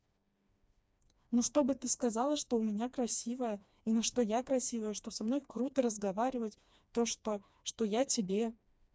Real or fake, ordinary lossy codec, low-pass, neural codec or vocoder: fake; none; none; codec, 16 kHz, 2 kbps, FreqCodec, smaller model